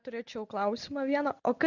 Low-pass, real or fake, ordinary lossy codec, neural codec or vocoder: 7.2 kHz; real; Opus, 64 kbps; none